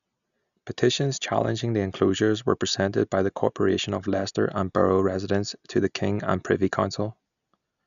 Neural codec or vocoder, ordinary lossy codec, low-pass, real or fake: none; none; 7.2 kHz; real